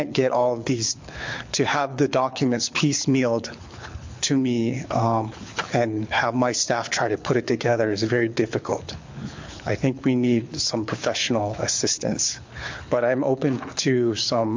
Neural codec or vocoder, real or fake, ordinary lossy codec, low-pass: codec, 24 kHz, 6 kbps, HILCodec; fake; MP3, 48 kbps; 7.2 kHz